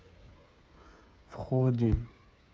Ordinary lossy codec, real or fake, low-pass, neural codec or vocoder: none; fake; none; codec, 16 kHz, 8 kbps, FreqCodec, smaller model